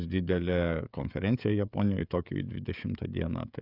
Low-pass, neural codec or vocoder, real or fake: 5.4 kHz; codec, 16 kHz, 16 kbps, FreqCodec, smaller model; fake